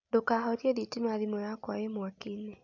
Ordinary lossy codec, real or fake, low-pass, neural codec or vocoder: none; real; 7.2 kHz; none